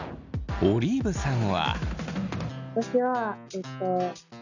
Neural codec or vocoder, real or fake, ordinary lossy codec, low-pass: none; real; none; 7.2 kHz